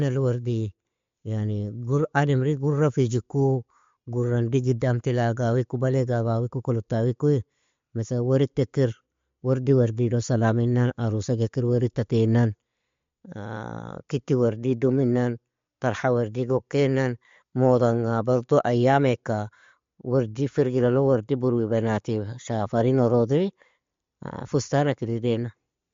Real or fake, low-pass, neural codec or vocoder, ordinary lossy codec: real; 7.2 kHz; none; MP3, 48 kbps